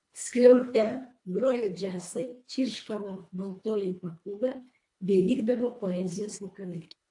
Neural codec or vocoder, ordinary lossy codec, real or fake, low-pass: codec, 24 kHz, 1.5 kbps, HILCodec; AAC, 64 kbps; fake; 10.8 kHz